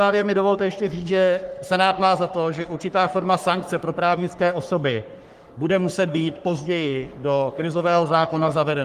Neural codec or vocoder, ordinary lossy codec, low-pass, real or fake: codec, 44.1 kHz, 3.4 kbps, Pupu-Codec; Opus, 24 kbps; 14.4 kHz; fake